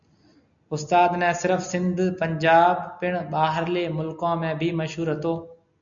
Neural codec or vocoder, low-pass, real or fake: none; 7.2 kHz; real